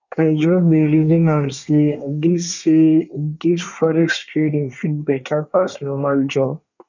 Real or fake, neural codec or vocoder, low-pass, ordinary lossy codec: fake; codec, 24 kHz, 1 kbps, SNAC; 7.2 kHz; none